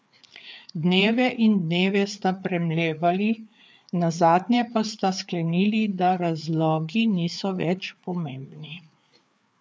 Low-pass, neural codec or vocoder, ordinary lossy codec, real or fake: none; codec, 16 kHz, 4 kbps, FreqCodec, larger model; none; fake